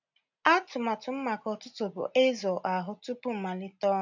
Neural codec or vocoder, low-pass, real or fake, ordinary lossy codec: none; 7.2 kHz; real; none